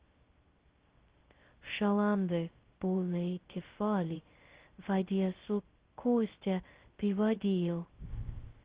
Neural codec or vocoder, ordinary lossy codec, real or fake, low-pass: codec, 16 kHz, 0.2 kbps, FocalCodec; Opus, 16 kbps; fake; 3.6 kHz